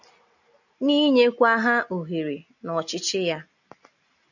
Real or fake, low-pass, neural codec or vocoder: real; 7.2 kHz; none